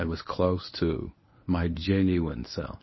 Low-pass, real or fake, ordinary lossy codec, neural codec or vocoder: 7.2 kHz; fake; MP3, 24 kbps; codec, 24 kHz, 0.9 kbps, WavTokenizer, medium speech release version 1